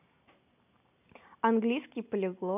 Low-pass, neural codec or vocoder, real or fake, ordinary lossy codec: 3.6 kHz; none; real; none